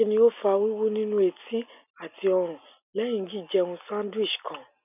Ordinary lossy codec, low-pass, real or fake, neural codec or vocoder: none; 3.6 kHz; real; none